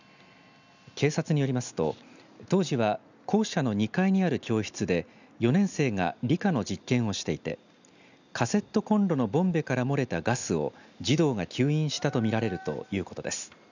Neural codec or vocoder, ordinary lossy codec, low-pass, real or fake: none; none; 7.2 kHz; real